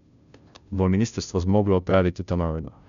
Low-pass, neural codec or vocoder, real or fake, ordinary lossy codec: 7.2 kHz; codec, 16 kHz, 0.5 kbps, FunCodec, trained on Chinese and English, 25 frames a second; fake; none